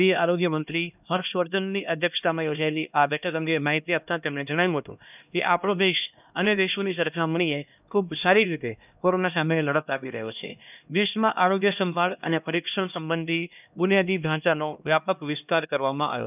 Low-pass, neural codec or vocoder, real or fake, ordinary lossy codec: 3.6 kHz; codec, 16 kHz, 1 kbps, X-Codec, HuBERT features, trained on LibriSpeech; fake; none